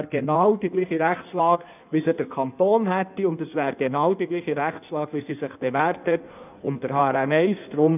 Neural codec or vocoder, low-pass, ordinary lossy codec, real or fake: codec, 16 kHz in and 24 kHz out, 1.1 kbps, FireRedTTS-2 codec; 3.6 kHz; none; fake